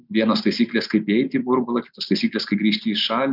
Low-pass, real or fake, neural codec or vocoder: 5.4 kHz; real; none